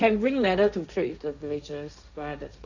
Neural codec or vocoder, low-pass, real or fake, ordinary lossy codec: codec, 16 kHz, 1.1 kbps, Voila-Tokenizer; 7.2 kHz; fake; none